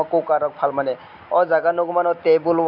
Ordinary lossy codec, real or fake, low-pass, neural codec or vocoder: AAC, 48 kbps; real; 5.4 kHz; none